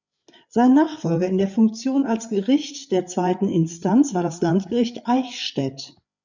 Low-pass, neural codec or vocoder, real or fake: 7.2 kHz; codec, 16 kHz, 8 kbps, FreqCodec, larger model; fake